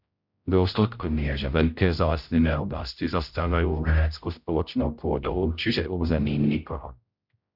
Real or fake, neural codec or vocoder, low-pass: fake; codec, 16 kHz, 0.5 kbps, X-Codec, HuBERT features, trained on general audio; 5.4 kHz